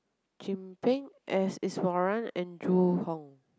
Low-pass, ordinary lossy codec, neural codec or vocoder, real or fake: none; none; none; real